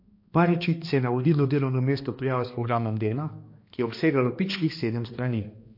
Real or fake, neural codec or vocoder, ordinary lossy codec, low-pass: fake; codec, 16 kHz, 2 kbps, X-Codec, HuBERT features, trained on balanced general audio; MP3, 32 kbps; 5.4 kHz